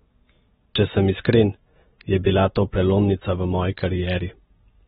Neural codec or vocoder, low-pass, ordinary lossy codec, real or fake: none; 19.8 kHz; AAC, 16 kbps; real